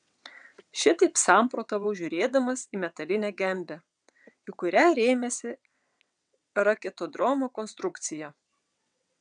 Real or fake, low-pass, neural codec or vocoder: fake; 9.9 kHz; vocoder, 22.05 kHz, 80 mel bands, WaveNeXt